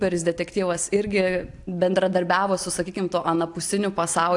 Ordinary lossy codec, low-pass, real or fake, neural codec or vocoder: AAC, 64 kbps; 10.8 kHz; real; none